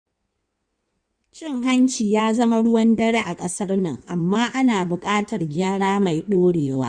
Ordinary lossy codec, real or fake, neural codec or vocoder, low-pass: Opus, 64 kbps; fake; codec, 16 kHz in and 24 kHz out, 1.1 kbps, FireRedTTS-2 codec; 9.9 kHz